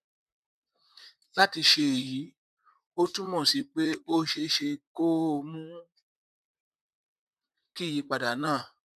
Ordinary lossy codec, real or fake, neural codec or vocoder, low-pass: none; fake; vocoder, 44.1 kHz, 128 mel bands, Pupu-Vocoder; 14.4 kHz